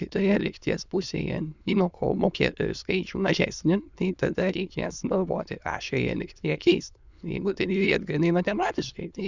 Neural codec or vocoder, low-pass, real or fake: autoencoder, 22.05 kHz, a latent of 192 numbers a frame, VITS, trained on many speakers; 7.2 kHz; fake